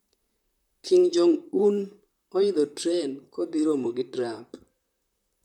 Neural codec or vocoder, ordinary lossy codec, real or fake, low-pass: vocoder, 44.1 kHz, 128 mel bands, Pupu-Vocoder; none; fake; 19.8 kHz